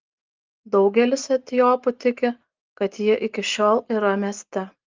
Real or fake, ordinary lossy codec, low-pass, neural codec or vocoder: real; Opus, 32 kbps; 7.2 kHz; none